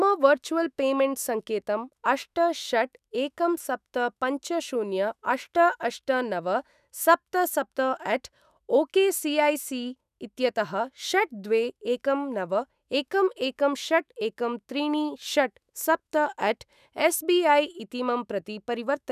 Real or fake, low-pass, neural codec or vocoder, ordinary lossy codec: fake; 14.4 kHz; autoencoder, 48 kHz, 128 numbers a frame, DAC-VAE, trained on Japanese speech; none